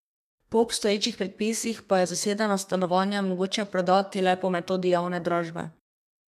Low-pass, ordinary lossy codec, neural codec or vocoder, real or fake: 14.4 kHz; none; codec, 32 kHz, 1.9 kbps, SNAC; fake